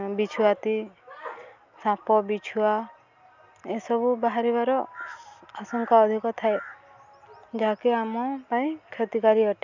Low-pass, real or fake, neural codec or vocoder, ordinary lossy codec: 7.2 kHz; real; none; none